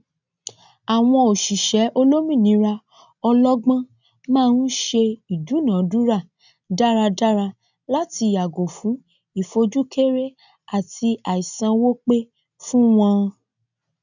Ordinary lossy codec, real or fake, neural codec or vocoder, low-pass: none; real; none; 7.2 kHz